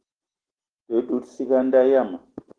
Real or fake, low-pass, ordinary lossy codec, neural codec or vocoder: real; 9.9 kHz; Opus, 16 kbps; none